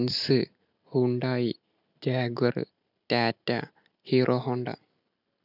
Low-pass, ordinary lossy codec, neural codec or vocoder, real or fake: 5.4 kHz; none; none; real